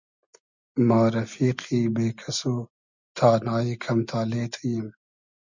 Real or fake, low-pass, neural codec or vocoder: real; 7.2 kHz; none